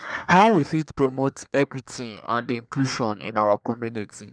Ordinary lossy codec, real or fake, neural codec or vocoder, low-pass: none; fake; codec, 24 kHz, 1 kbps, SNAC; 9.9 kHz